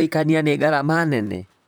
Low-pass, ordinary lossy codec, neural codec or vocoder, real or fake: none; none; vocoder, 44.1 kHz, 128 mel bands, Pupu-Vocoder; fake